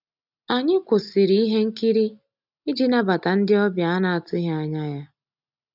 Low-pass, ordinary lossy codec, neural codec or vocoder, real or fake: 5.4 kHz; none; none; real